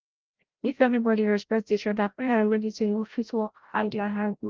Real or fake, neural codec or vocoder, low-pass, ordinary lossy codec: fake; codec, 16 kHz, 0.5 kbps, FreqCodec, larger model; 7.2 kHz; Opus, 32 kbps